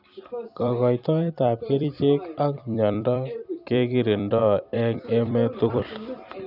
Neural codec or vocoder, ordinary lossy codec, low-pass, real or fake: vocoder, 44.1 kHz, 128 mel bands every 256 samples, BigVGAN v2; none; 5.4 kHz; fake